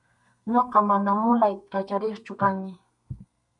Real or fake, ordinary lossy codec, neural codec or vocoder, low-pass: fake; MP3, 96 kbps; codec, 32 kHz, 1.9 kbps, SNAC; 10.8 kHz